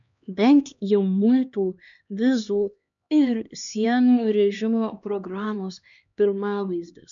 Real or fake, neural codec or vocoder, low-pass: fake; codec, 16 kHz, 2 kbps, X-Codec, HuBERT features, trained on LibriSpeech; 7.2 kHz